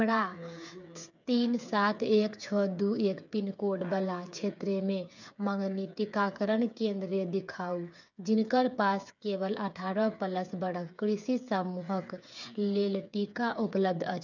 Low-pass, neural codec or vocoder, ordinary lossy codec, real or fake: 7.2 kHz; codec, 16 kHz, 8 kbps, FreqCodec, smaller model; none; fake